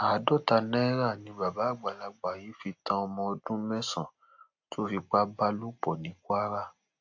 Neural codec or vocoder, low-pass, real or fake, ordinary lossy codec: none; 7.2 kHz; real; AAC, 48 kbps